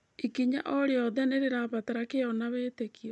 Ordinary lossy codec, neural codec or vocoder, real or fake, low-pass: none; none; real; none